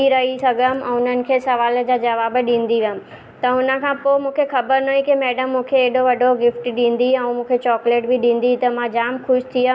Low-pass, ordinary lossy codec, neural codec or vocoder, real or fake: none; none; none; real